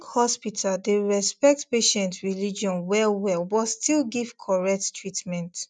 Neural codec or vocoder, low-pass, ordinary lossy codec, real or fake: none; 9.9 kHz; none; real